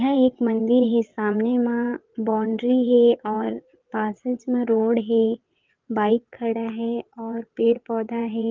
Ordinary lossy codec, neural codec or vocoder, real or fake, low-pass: Opus, 24 kbps; vocoder, 22.05 kHz, 80 mel bands, WaveNeXt; fake; 7.2 kHz